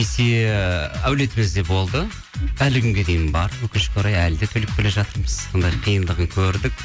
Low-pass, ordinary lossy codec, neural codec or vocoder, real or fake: none; none; none; real